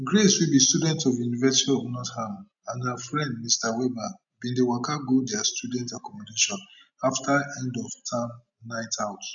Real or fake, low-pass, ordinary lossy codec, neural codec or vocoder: real; 7.2 kHz; none; none